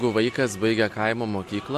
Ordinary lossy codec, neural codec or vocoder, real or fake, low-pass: MP3, 64 kbps; none; real; 14.4 kHz